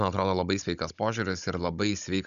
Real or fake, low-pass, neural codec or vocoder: fake; 7.2 kHz; codec, 16 kHz, 16 kbps, FunCodec, trained on Chinese and English, 50 frames a second